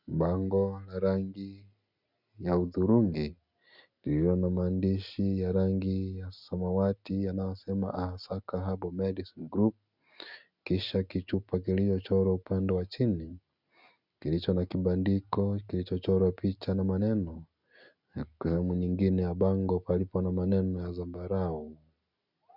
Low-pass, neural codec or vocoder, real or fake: 5.4 kHz; none; real